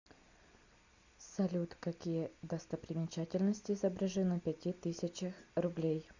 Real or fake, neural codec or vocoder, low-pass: real; none; 7.2 kHz